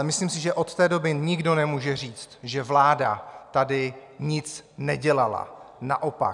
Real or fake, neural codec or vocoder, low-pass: real; none; 10.8 kHz